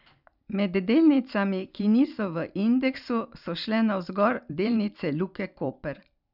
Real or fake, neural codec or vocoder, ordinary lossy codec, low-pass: fake; vocoder, 44.1 kHz, 128 mel bands every 512 samples, BigVGAN v2; none; 5.4 kHz